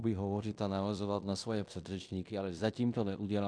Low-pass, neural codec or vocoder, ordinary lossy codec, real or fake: 9.9 kHz; codec, 16 kHz in and 24 kHz out, 0.9 kbps, LongCat-Audio-Codec, fine tuned four codebook decoder; MP3, 96 kbps; fake